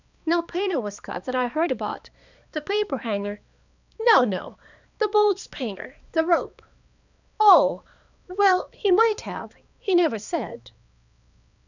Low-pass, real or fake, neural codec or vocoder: 7.2 kHz; fake; codec, 16 kHz, 2 kbps, X-Codec, HuBERT features, trained on balanced general audio